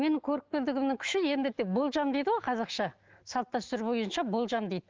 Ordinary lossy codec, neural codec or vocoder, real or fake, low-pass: Opus, 16 kbps; autoencoder, 48 kHz, 128 numbers a frame, DAC-VAE, trained on Japanese speech; fake; 7.2 kHz